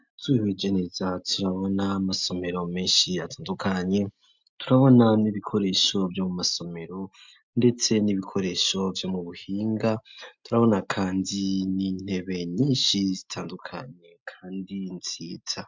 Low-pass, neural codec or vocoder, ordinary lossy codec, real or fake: 7.2 kHz; none; MP3, 64 kbps; real